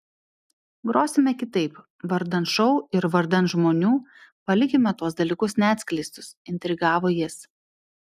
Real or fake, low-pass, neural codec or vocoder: real; 14.4 kHz; none